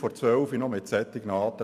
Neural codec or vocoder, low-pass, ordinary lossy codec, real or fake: none; 14.4 kHz; none; real